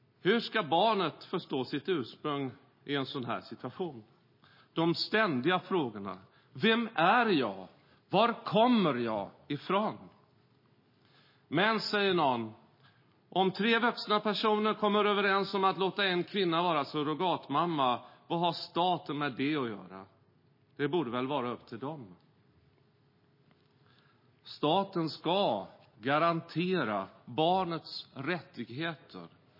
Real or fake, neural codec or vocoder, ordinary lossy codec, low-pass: real; none; MP3, 24 kbps; 5.4 kHz